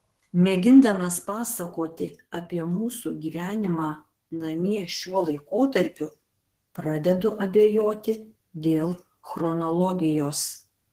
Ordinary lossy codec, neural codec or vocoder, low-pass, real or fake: Opus, 16 kbps; codec, 32 kHz, 1.9 kbps, SNAC; 14.4 kHz; fake